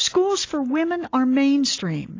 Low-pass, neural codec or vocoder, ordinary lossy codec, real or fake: 7.2 kHz; none; AAC, 32 kbps; real